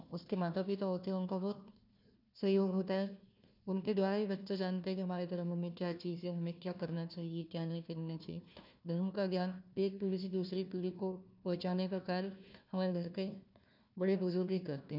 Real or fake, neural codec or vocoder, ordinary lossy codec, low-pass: fake; codec, 16 kHz, 1 kbps, FunCodec, trained on Chinese and English, 50 frames a second; none; 5.4 kHz